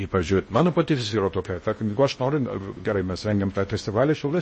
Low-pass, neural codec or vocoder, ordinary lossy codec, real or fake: 10.8 kHz; codec, 16 kHz in and 24 kHz out, 0.6 kbps, FocalCodec, streaming, 4096 codes; MP3, 32 kbps; fake